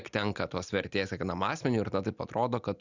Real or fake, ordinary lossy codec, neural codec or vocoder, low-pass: real; Opus, 64 kbps; none; 7.2 kHz